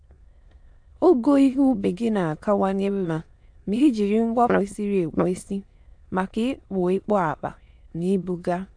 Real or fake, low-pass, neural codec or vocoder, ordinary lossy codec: fake; 9.9 kHz; autoencoder, 22.05 kHz, a latent of 192 numbers a frame, VITS, trained on many speakers; AAC, 64 kbps